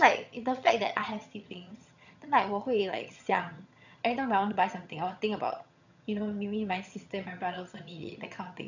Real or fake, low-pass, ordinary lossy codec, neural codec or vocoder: fake; 7.2 kHz; none; vocoder, 22.05 kHz, 80 mel bands, HiFi-GAN